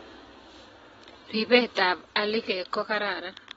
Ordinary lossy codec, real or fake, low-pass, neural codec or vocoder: AAC, 24 kbps; real; 9.9 kHz; none